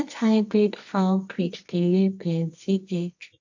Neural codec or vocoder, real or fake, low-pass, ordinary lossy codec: codec, 24 kHz, 0.9 kbps, WavTokenizer, medium music audio release; fake; 7.2 kHz; AAC, 48 kbps